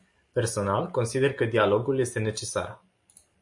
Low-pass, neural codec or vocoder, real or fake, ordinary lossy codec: 10.8 kHz; none; real; MP3, 48 kbps